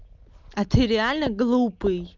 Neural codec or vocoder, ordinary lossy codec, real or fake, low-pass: none; Opus, 32 kbps; real; 7.2 kHz